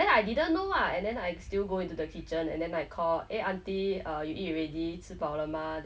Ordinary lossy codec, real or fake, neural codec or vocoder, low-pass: none; real; none; none